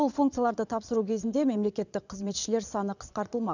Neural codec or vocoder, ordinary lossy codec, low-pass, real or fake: vocoder, 22.05 kHz, 80 mel bands, Vocos; none; 7.2 kHz; fake